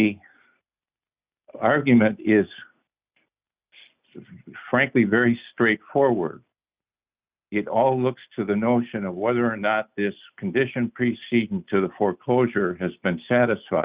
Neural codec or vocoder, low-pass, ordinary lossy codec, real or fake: none; 3.6 kHz; Opus, 64 kbps; real